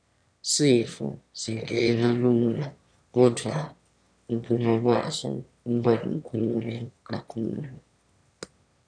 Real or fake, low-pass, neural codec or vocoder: fake; 9.9 kHz; autoencoder, 22.05 kHz, a latent of 192 numbers a frame, VITS, trained on one speaker